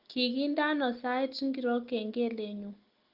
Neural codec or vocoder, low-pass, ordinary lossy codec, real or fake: none; 5.4 kHz; Opus, 32 kbps; real